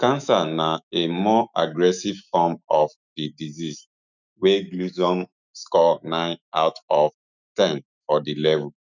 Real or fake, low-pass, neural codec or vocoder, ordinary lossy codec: fake; 7.2 kHz; autoencoder, 48 kHz, 128 numbers a frame, DAC-VAE, trained on Japanese speech; none